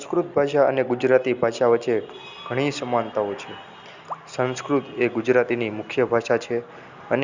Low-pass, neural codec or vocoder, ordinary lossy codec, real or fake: 7.2 kHz; none; Opus, 64 kbps; real